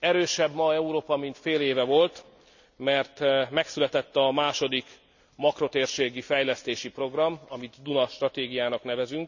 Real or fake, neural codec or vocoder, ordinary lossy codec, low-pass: real; none; none; 7.2 kHz